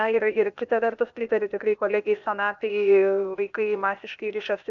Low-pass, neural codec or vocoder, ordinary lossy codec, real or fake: 7.2 kHz; codec, 16 kHz, 0.8 kbps, ZipCodec; MP3, 96 kbps; fake